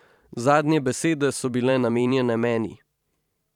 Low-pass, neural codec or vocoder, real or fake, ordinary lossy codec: 19.8 kHz; vocoder, 44.1 kHz, 128 mel bands every 512 samples, BigVGAN v2; fake; none